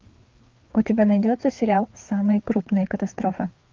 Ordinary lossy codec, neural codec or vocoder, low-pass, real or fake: Opus, 32 kbps; codec, 16 kHz, 4 kbps, FreqCodec, larger model; 7.2 kHz; fake